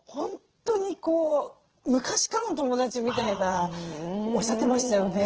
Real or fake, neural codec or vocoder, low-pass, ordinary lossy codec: fake; vocoder, 22.05 kHz, 80 mel bands, Vocos; 7.2 kHz; Opus, 16 kbps